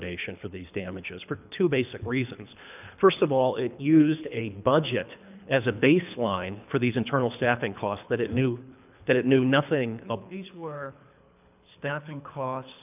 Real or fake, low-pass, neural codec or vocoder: fake; 3.6 kHz; codec, 24 kHz, 3 kbps, HILCodec